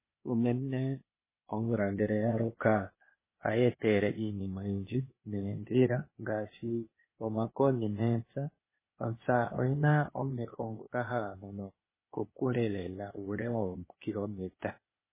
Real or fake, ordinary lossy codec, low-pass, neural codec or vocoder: fake; MP3, 16 kbps; 3.6 kHz; codec, 16 kHz, 0.8 kbps, ZipCodec